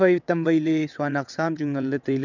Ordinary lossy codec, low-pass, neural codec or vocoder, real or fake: none; 7.2 kHz; vocoder, 22.05 kHz, 80 mel bands, WaveNeXt; fake